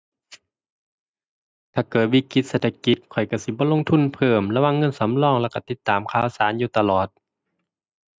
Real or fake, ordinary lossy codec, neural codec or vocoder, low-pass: real; none; none; none